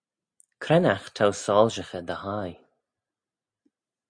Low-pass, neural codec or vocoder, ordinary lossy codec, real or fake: 9.9 kHz; none; Opus, 64 kbps; real